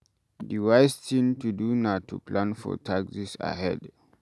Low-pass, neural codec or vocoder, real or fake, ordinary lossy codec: none; none; real; none